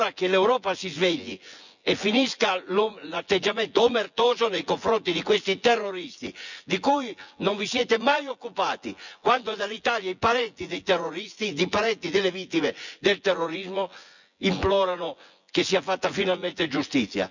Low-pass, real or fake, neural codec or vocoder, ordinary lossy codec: 7.2 kHz; fake; vocoder, 24 kHz, 100 mel bands, Vocos; none